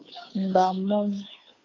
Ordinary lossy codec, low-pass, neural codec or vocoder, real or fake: AAC, 32 kbps; 7.2 kHz; codec, 16 kHz, 2 kbps, FunCodec, trained on Chinese and English, 25 frames a second; fake